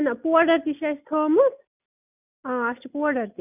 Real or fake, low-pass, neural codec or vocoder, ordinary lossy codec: real; 3.6 kHz; none; none